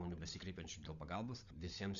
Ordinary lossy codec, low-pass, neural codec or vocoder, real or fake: Opus, 64 kbps; 7.2 kHz; codec, 16 kHz, 16 kbps, FunCodec, trained on LibriTTS, 50 frames a second; fake